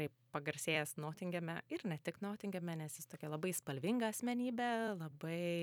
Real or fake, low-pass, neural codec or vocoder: fake; 19.8 kHz; vocoder, 44.1 kHz, 128 mel bands every 256 samples, BigVGAN v2